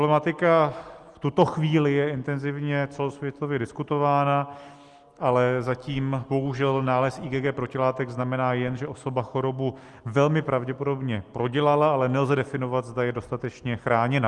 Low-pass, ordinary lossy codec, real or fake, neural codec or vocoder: 10.8 kHz; Opus, 24 kbps; real; none